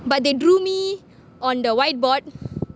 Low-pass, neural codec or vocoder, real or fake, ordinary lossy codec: none; none; real; none